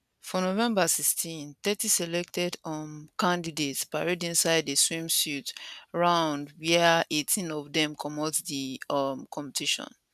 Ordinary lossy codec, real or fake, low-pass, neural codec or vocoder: none; real; 14.4 kHz; none